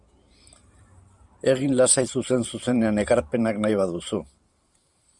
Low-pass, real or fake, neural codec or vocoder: 10.8 kHz; fake; vocoder, 44.1 kHz, 128 mel bands every 512 samples, BigVGAN v2